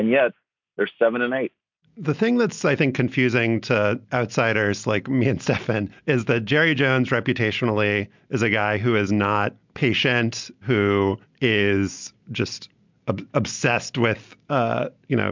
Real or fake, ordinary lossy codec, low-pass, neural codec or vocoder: real; MP3, 64 kbps; 7.2 kHz; none